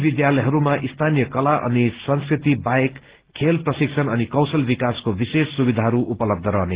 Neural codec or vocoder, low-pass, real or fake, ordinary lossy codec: none; 3.6 kHz; real; Opus, 16 kbps